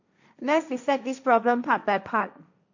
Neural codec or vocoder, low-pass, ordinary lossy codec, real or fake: codec, 16 kHz, 1.1 kbps, Voila-Tokenizer; 7.2 kHz; none; fake